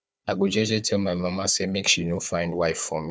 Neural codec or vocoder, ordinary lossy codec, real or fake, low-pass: codec, 16 kHz, 4 kbps, FunCodec, trained on Chinese and English, 50 frames a second; none; fake; none